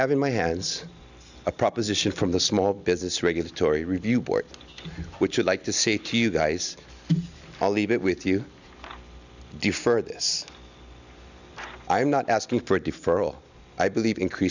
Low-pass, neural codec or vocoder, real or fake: 7.2 kHz; none; real